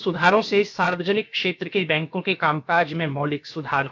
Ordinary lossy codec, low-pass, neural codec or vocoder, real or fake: none; 7.2 kHz; codec, 16 kHz, about 1 kbps, DyCAST, with the encoder's durations; fake